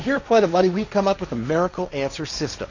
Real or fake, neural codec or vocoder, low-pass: fake; codec, 16 kHz, 1.1 kbps, Voila-Tokenizer; 7.2 kHz